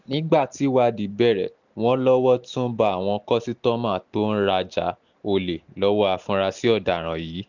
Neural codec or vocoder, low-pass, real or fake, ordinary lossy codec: none; 7.2 kHz; real; none